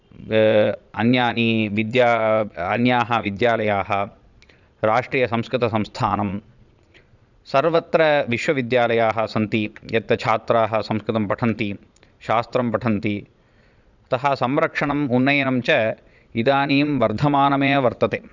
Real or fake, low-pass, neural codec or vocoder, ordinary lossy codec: fake; 7.2 kHz; vocoder, 22.05 kHz, 80 mel bands, Vocos; none